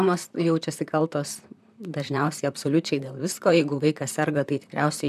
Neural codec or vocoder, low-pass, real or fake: vocoder, 44.1 kHz, 128 mel bands, Pupu-Vocoder; 14.4 kHz; fake